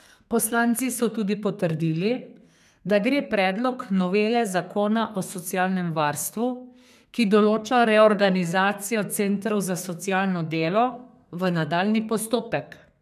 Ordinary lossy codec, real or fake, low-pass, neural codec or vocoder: none; fake; 14.4 kHz; codec, 32 kHz, 1.9 kbps, SNAC